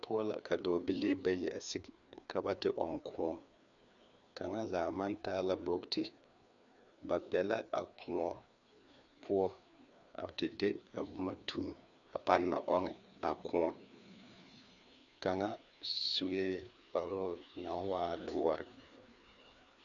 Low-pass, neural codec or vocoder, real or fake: 7.2 kHz; codec, 16 kHz, 2 kbps, FreqCodec, larger model; fake